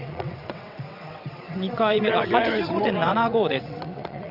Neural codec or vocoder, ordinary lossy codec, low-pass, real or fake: vocoder, 22.05 kHz, 80 mel bands, WaveNeXt; none; 5.4 kHz; fake